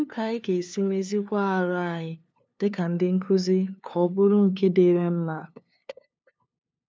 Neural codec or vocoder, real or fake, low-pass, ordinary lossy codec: codec, 16 kHz, 2 kbps, FunCodec, trained on LibriTTS, 25 frames a second; fake; none; none